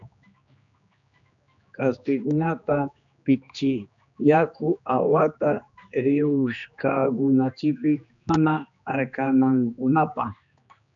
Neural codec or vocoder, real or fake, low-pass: codec, 16 kHz, 2 kbps, X-Codec, HuBERT features, trained on general audio; fake; 7.2 kHz